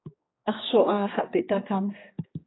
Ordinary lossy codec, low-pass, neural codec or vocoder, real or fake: AAC, 16 kbps; 7.2 kHz; codec, 16 kHz, 2 kbps, X-Codec, HuBERT features, trained on general audio; fake